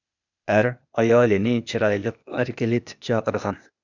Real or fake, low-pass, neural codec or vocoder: fake; 7.2 kHz; codec, 16 kHz, 0.8 kbps, ZipCodec